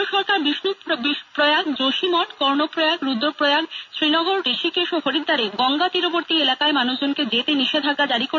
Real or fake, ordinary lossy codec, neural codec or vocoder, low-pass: real; none; none; 7.2 kHz